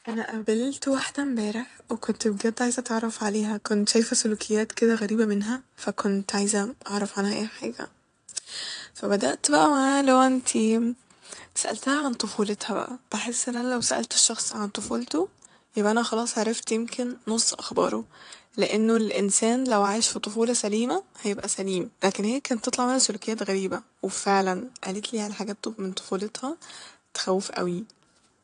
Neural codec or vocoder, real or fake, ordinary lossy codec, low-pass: vocoder, 22.05 kHz, 80 mel bands, WaveNeXt; fake; AAC, 64 kbps; 9.9 kHz